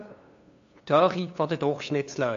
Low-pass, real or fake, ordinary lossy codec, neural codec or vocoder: 7.2 kHz; fake; AAC, 48 kbps; codec, 16 kHz, 2 kbps, FunCodec, trained on LibriTTS, 25 frames a second